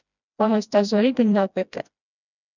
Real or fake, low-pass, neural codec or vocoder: fake; 7.2 kHz; codec, 16 kHz, 1 kbps, FreqCodec, smaller model